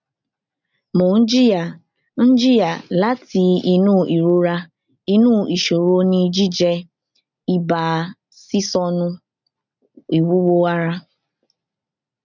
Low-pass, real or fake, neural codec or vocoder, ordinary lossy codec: 7.2 kHz; real; none; none